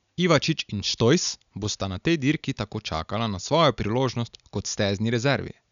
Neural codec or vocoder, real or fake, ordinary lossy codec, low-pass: none; real; none; 7.2 kHz